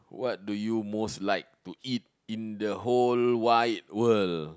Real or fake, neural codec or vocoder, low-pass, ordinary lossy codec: real; none; none; none